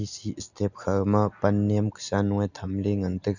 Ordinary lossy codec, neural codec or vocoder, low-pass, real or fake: none; none; 7.2 kHz; real